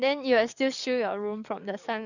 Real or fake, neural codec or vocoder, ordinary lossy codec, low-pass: fake; codec, 44.1 kHz, 7.8 kbps, DAC; none; 7.2 kHz